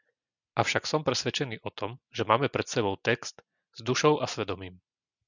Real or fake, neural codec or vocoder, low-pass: real; none; 7.2 kHz